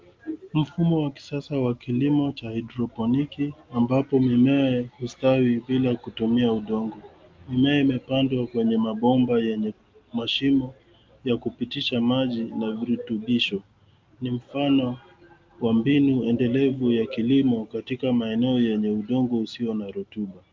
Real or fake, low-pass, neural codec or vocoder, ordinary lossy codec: real; 7.2 kHz; none; Opus, 32 kbps